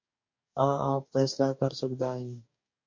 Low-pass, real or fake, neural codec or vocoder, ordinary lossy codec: 7.2 kHz; fake; codec, 44.1 kHz, 2.6 kbps, DAC; MP3, 48 kbps